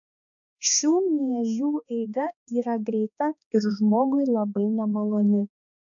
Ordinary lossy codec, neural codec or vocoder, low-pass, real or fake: AAC, 64 kbps; codec, 16 kHz, 2 kbps, X-Codec, HuBERT features, trained on balanced general audio; 7.2 kHz; fake